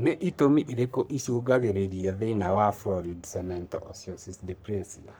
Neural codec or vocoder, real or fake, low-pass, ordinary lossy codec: codec, 44.1 kHz, 3.4 kbps, Pupu-Codec; fake; none; none